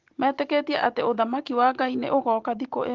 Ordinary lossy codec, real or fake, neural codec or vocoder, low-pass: Opus, 16 kbps; real; none; 7.2 kHz